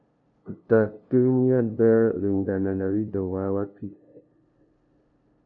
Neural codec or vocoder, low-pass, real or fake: codec, 16 kHz, 0.5 kbps, FunCodec, trained on LibriTTS, 25 frames a second; 7.2 kHz; fake